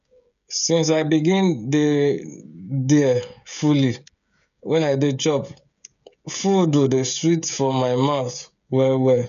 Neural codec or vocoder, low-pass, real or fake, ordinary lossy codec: codec, 16 kHz, 16 kbps, FreqCodec, smaller model; 7.2 kHz; fake; none